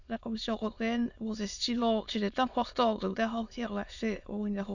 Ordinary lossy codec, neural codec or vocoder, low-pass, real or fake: none; autoencoder, 22.05 kHz, a latent of 192 numbers a frame, VITS, trained on many speakers; 7.2 kHz; fake